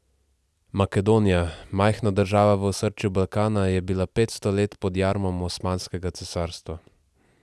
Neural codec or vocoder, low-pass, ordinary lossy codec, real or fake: none; none; none; real